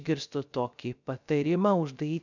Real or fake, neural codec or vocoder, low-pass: fake; codec, 16 kHz, 0.3 kbps, FocalCodec; 7.2 kHz